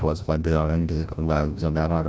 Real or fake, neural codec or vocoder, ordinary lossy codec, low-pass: fake; codec, 16 kHz, 0.5 kbps, FreqCodec, larger model; none; none